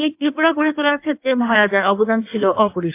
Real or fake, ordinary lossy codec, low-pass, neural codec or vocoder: fake; AAC, 24 kbps; 3.6 kHz; codec, 16 kHz in and 24 kHz out, 1.1 kbps, FireRedTTS-2 codec